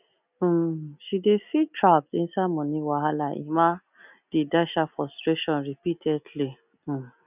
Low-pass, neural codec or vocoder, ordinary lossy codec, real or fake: 3.6 kHz; none; none; real